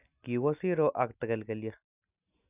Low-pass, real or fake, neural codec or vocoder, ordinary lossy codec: 3.6 kHz; real; none; none